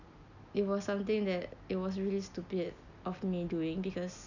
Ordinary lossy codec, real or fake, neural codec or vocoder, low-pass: none; real; none; 7.2 kHz